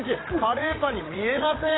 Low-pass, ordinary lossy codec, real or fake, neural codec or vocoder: 7.2 kHz; AAC, 16 kbps; fake; codec, 16 kHz, 8 kbps, FreqCodec, larger model